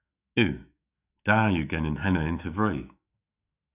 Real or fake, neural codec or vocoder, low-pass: fake; autoencoder, 48 kHz, 128 numbers a frame, DAC-VAE, trained on Japanese speech; 3.6 kHz